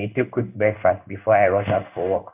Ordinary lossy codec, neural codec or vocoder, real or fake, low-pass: none; codec, 16 kHz in and 24 kHz out, 1 kbps, XY-Tokenizer; fake; 3.6 kHz